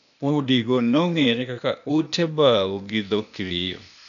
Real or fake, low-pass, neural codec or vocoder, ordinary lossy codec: fake; 7.2 kHz; codec, 16 kHz, 0.8 kbps, ZipCodec; none